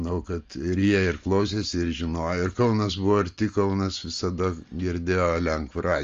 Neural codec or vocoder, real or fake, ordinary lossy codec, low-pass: none; real; Opus, 32 kbps; 7.2 kHz